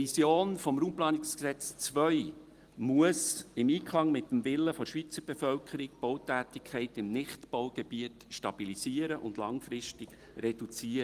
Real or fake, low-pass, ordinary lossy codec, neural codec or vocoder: real; 14.4 kHz; Opus, 24 kbps; none